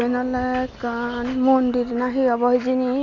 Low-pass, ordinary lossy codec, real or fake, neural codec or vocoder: 7.2 kHz; none; real; none